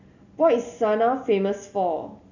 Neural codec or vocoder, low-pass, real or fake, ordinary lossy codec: none; 7.2 kHz; real; none